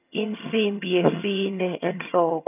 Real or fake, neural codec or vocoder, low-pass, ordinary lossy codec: fake; vocoder, 22.05 kHz, 80 mel bands, HiFi-GAN; 3.6 kHz; none